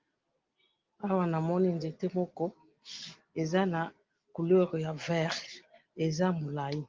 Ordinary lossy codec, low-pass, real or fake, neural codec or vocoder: Opus, 32 kbps; 7.2 kHz; real; none